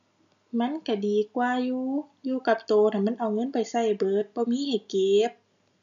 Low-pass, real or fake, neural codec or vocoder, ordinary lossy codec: 7.2 kHz; real; none; none